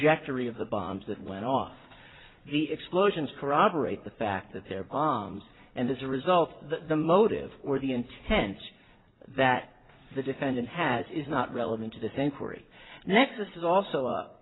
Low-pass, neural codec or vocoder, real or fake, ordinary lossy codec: 7.2 kHz; vocoder, 44.1 kHz, 80 mel bands, Vocos; fake; AAC, 16 kbps